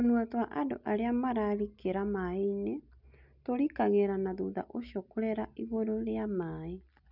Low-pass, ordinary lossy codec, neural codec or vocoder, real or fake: 5.4 kHz; none; none; real